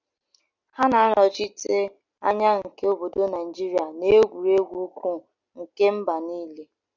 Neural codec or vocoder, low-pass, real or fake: none; 7.2 kHz; real